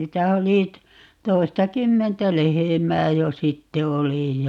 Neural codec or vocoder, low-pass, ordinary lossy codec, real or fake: none; 19.8 kHz; none; real